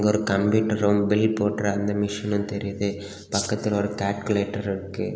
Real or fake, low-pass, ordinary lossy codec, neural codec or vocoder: real; none; none; none